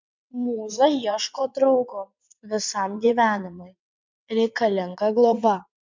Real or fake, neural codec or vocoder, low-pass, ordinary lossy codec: fake; vocoder, 22.05 kHz, 80 mel bands, WaveNeXt; 7.2 kHz; MP3, 64 kbps